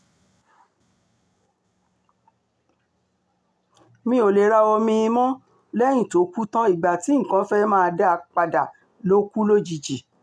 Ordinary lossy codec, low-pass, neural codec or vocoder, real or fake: none; none; none; real